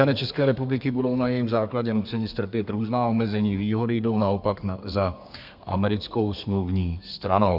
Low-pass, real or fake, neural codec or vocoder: 5.4 kHz; fake; codec, 32 kHz, 1.9 kbps, SNAC